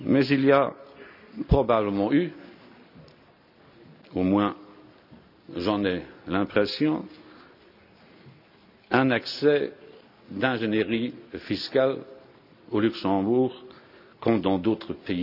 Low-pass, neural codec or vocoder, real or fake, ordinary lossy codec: 5.4 kHz; none; real; none